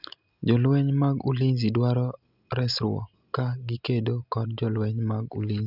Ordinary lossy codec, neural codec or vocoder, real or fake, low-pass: none; none; real; 5.4 kHz